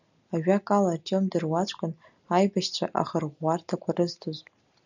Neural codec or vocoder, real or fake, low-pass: none; real; 7.2 kHz